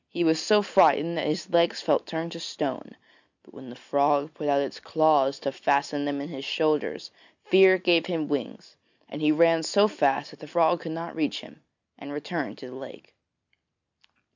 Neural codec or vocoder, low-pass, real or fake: none; 7.2 kHz; real